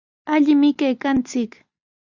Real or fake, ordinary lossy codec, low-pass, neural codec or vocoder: real; Opus, 64 kbps; 7.2 kHz; none